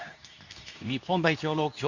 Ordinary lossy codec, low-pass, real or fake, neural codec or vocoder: none; 7.2 kHz; fake; codec, 24 kHz, 0.9 kbps, WavTokenizer, medium speech release version 2